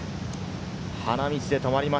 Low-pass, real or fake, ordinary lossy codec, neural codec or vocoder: none; real; none; none